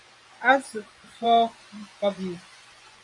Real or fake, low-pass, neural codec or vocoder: fake; 10.8 kHz; vocoder, 24 kHz, 100 mel bands, Vocos